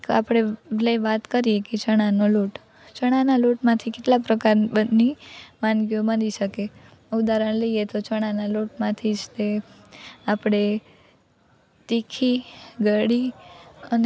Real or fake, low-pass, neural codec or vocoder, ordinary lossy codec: real; none; none; none